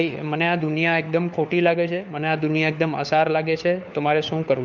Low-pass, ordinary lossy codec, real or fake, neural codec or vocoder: none; none; fake; codec, 16 kHz, 4 kbps, FunCodec, trained on LibriTTS, 50 frames a second